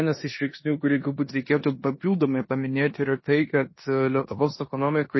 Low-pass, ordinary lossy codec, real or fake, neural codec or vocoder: 7.2 kHz; MP3, 24 kbps; fake; codec, 16 kHz in and 24 kHz out, 0.9 kbps, LongCat-Audio-Codec, four codebook decoder